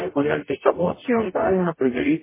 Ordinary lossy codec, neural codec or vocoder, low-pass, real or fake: MP3, 16 kbps; codec, 44.1 kHz, 0.9 kbps, DAC; 3.6 kHz; fake